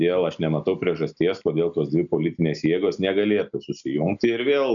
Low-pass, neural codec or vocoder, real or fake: 7.2 kHz; none; real